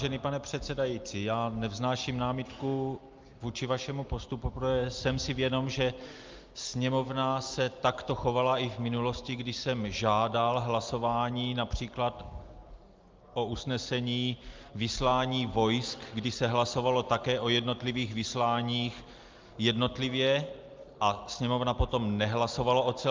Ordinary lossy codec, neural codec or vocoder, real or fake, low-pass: Opus, 32 kbps; none; real; 7.2 kHz